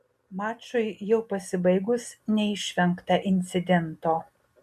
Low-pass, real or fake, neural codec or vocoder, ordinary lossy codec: 14.4 kHz; real; none; MP3, 64 kbps